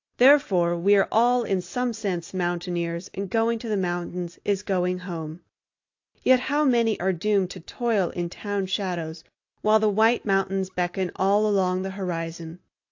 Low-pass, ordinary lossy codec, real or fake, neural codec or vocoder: 7.2 kHz; AAC, 48 kbps; real; none